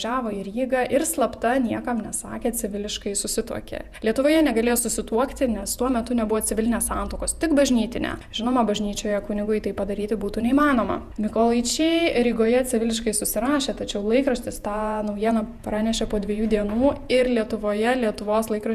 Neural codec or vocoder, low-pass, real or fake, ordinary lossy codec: vocoder, 48 kHz, 128 mel bands, Vocos; 14.4 kHz; fake; Opus, 64 kbps